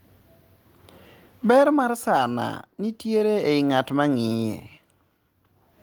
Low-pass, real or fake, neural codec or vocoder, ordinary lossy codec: 19.8 kHz; real; none; Opus, 24 kbps